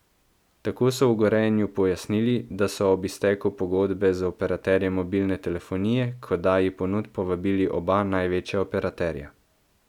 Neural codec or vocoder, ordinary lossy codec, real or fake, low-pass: none; none; real; 19.8 kHz